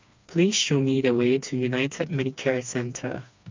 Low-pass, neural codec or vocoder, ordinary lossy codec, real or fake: 7.2 kHz; codec, 16 kHz, 2 kbps, FreqCodec, smaller model; MP3, 64 kbps; fake